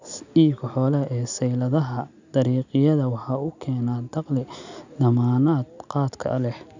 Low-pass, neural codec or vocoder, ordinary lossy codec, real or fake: 7.2 kHz; none; none; real